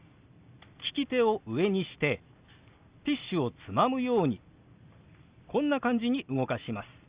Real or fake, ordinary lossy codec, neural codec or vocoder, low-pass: real; Opus, 64 kbps; none; 3.6 kHz